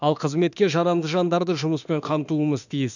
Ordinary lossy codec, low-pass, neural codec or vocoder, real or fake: none; 7.2 kHz; autoencoder, 48 kHz, 32 numbers a frame, DAC-VAE, trained on Japanese speech; fake